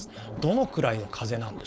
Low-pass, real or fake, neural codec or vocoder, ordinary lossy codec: none; fake; codec, 16 kHz, 4.8 kbps, FACodec; none